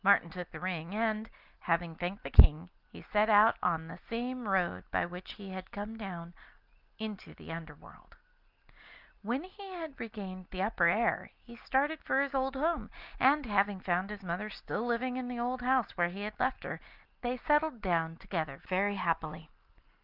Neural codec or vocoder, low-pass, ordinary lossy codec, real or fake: none; 5.4 kHz; Opus, 32 kbps; real